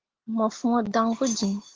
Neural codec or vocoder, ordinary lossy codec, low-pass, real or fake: none; Opus, 16 kbps; 7.2 kHz; real